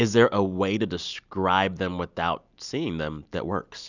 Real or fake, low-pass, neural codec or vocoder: real; 7.2 kHz; none